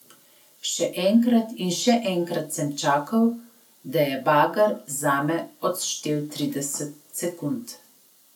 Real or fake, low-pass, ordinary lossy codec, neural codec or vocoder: real; 19.8 kHz; none; none